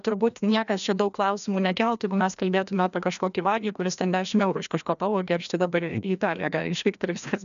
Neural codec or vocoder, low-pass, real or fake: codec, 16 kHz, 1 kbps, FreqCodec, larger model; 7.2 kHz; fake